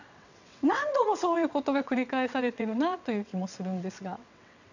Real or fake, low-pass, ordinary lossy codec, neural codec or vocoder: fake; 7.2 kHz; none; vocoder, 22.05 kHz, 80 mel bands, WaveNeXt